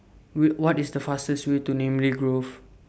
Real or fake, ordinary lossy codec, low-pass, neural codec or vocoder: real; none; none; none